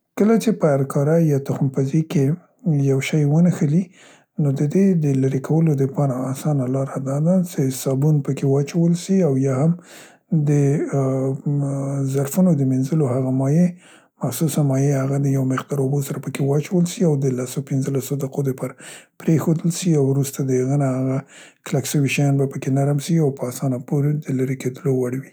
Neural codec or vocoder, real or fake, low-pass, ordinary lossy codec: none; real; none; none